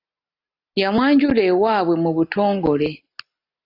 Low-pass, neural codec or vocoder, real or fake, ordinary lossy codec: 5.4 kHz; none; real; AAC, 32 kbps